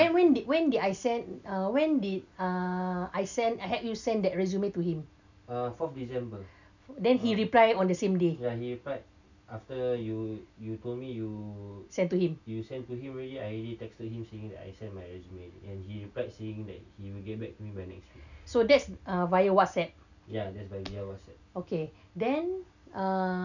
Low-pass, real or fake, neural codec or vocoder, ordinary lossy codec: 7.2 kHz; real; none; none